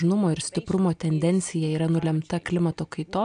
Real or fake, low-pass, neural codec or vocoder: real; 9.9 kHz; none